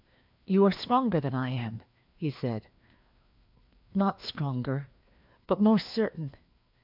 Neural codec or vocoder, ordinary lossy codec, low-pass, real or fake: codec, 16 kHz, 2 kbps, FreqCodec, larger model; MP3, 48 kbps; 5.4 kHz; fake